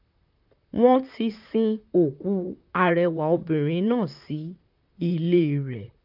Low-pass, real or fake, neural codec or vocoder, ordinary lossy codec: 5.4 kHz; fake; vocoder, 44.1 kHz, 128 mel bands, Pupu-Vocoder; none